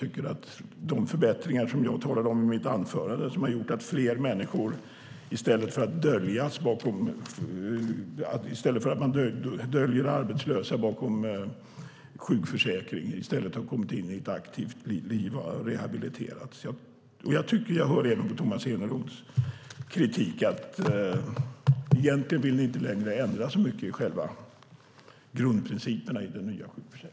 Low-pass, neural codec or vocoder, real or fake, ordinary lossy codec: none; none; real; none